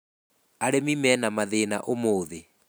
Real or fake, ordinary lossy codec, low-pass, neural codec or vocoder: real; none; none; none